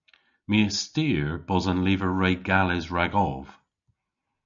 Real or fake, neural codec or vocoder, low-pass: real; none; 7.2 kHz